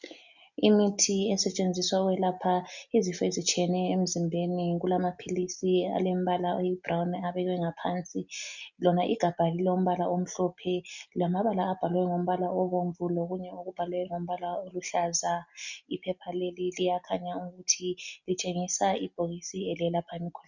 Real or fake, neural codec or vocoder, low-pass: real; none; 7.2 kHz